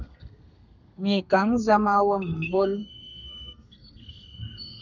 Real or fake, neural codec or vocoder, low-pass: fake; codec, 32 kHz, 1.9 kbps, SNAC; 7.2 kHz